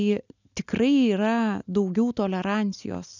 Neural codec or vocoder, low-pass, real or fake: none; 7.2 kHz; real